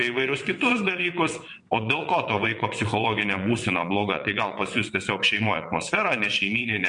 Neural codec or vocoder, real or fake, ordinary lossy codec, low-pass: vocoder, 22.05 kHz, 80 mel bands, WaveNeXt; fake; MP3, 48 kbps; 9.9 kHz